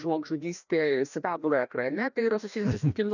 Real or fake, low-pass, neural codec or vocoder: fake; 7.2 kHz; codec, 16 kHz, 1 kbps, FreqCodec, larger model